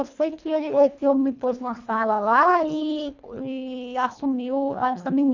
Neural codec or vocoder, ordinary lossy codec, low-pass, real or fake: codec, 24 kHz, 1.5 kbps, HILCodec; none; 7.2 kHz; fake